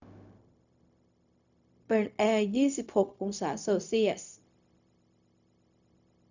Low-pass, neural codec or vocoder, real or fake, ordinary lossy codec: 7.2 kHz; codec, 16 kHz, 0.4 kbps, LongCat-Audio-Codec; fake; none